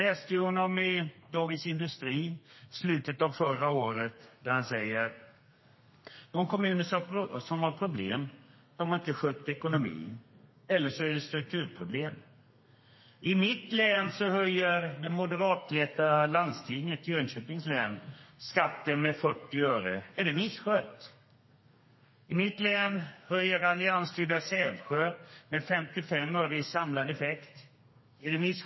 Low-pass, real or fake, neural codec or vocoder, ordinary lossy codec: 7.2 kHz; fake; codec, 32 kHz, 1.9 kbps, SNAC; MP3, 24 kbps